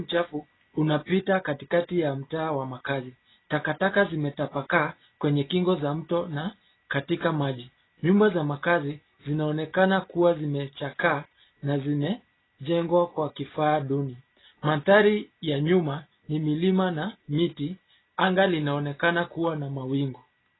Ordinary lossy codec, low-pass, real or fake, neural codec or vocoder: AAC, 16 kbps; 7.2 kHz; real; none